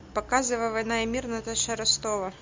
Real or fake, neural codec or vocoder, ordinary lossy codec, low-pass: real; none; MP3, 64 kbps; 7.2 kHz